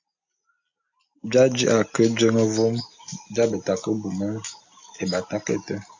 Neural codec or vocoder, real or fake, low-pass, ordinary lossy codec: none; real; 7.2 kHz; AAC, 48 kbps